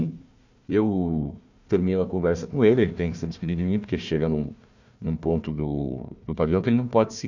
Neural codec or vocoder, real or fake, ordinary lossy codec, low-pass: codec, 16 kHz, 1 kbps, FunCodec, trained on Chinese and English, 50 frames a second; fake; none; 7.2 kHz